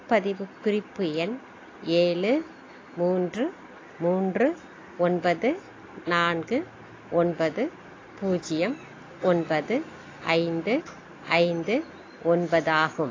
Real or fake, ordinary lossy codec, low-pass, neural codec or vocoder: real; AAC, 32 kbps; 7.2 kHz; none